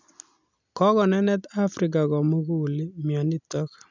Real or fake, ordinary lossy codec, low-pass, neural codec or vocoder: real; none; 7.2 kHz; none